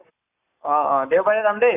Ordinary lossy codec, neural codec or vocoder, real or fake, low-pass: none; codec, 44.1 kHz, 7.8 kbps, Pupu-Codec; fake; 3.6 kHz